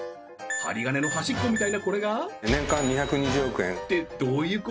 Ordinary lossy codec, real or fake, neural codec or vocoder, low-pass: none; real; none; none